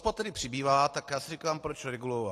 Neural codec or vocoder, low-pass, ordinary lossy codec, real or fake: vocoder, 44.1 kHz, 128 mel bands every 512 samples, BigVGAN v2; 14.4 kHz; AAC, 48 kbps; fake